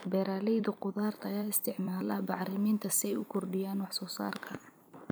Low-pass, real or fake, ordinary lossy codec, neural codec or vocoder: none; fake; none; vocoder, 44.1 kHz, 128 mel bands every 256 samples, BigVGAN v2